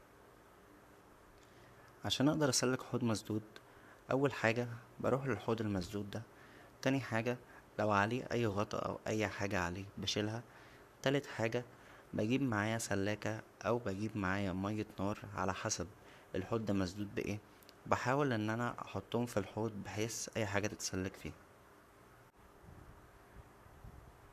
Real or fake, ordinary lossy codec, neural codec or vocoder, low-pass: fake; none; codec, 44.1 kHz, 7.8 kbps, Pupu-Codec; 14.4 kHz